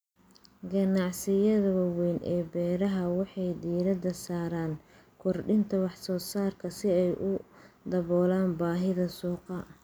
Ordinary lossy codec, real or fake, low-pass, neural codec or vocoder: none; real; none; none